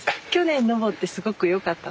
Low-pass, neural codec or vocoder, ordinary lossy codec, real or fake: none; none; none; real